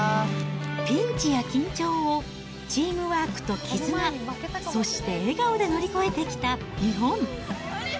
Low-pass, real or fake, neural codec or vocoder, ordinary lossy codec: none; real; none; none